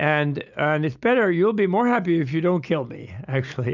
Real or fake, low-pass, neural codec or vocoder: real; 7.2 kHz; none